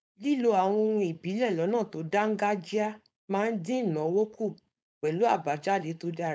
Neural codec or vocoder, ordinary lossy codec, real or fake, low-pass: codec, 16 kHz, 4.8 kbps, FACodec; none; fake; none